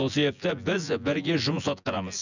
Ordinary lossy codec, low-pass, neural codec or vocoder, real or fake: none; 7.2 kHz; vocoder, 24 kHz, 100 mel bands, Vocos; fake